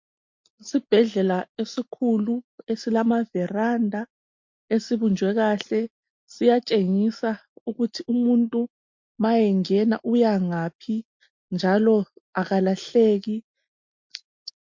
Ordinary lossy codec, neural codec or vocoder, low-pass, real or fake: MP3, 48 kbps; none; 7.2 kHz; real